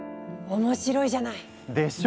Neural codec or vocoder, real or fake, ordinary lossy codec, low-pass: none; real; none; none